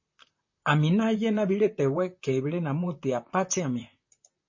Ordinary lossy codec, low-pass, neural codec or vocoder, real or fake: MP3, 32 kbps; 7.2 kHz; vocoder, 44.1 kHz, 128 mel bands, Pupu-Vocoder; fake